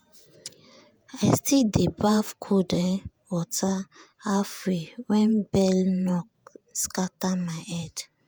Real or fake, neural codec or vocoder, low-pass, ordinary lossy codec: fake; vocoder, 48 kHz, 128 mel bands, Vocos; none; none